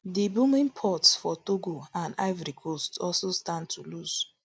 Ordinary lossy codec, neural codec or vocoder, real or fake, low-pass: none; none; real; none